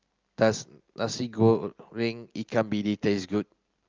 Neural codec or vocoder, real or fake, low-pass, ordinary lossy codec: none; real; 7.2 kHz; Opus, 32 kbps